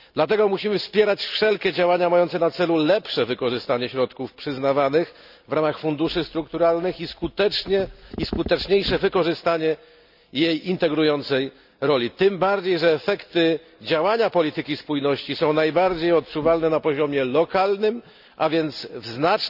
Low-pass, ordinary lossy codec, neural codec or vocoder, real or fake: 5.4 kHz; none; none; real